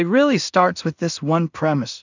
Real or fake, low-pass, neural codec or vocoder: fake; 7.2 kHz; codec, 16 kHz in and 24 kHz out, 0.4 kbps, LongCat-Audio-Codec, two codebook decoder